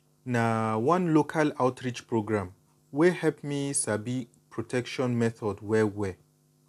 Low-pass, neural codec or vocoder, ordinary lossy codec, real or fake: 14.4 kHz; none; AAC, 96 kbps; real